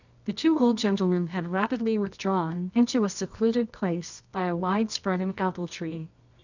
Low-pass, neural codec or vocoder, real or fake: 7.2 kHz; codec, 24 kHz, 0.9 kbps, WavTokenizer, medium music audio release; fake